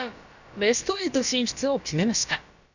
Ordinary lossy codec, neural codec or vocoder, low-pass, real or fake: none; codec, 16 kHz, about 1 kbps, DyCAST, with the encoder's durations; 7.2 kHz; fake